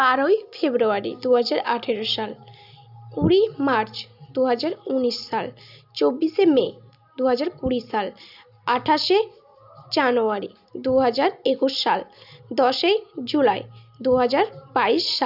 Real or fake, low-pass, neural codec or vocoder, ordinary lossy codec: real; 5.4 kHz; none; none